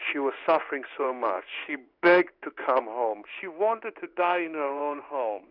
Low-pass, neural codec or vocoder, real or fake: 5.4 kHz; codec, 16 kHz in and 24 kHz out, 1 kbps, XY-Tokenizer; fake